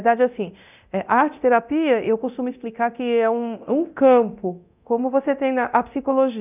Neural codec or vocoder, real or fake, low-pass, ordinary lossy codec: codec, 24 kHz, 0.9 kbps, DualCodec; fake; 3.6 kHz; none